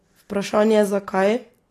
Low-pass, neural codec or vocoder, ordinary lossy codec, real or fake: 14.4 kHz; none; AAC, 48 kbps; real